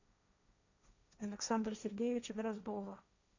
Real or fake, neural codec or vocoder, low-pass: fake; codec, 16 kHz, 1.1 kbps, Voila-Tokenizer; 7.2 kHz